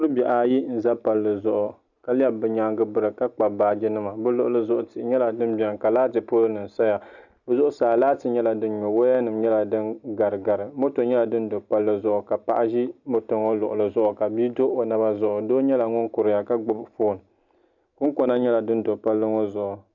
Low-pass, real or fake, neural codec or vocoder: 7.2 kHz; real; none